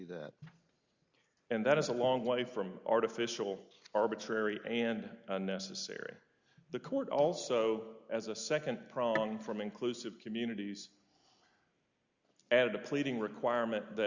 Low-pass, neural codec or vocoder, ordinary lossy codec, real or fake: 7.2 kHz; none; Opus, 64 kbps; real